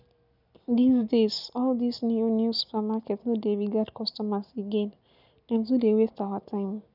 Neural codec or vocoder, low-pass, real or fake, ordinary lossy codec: none; 5.4 kHz; real; none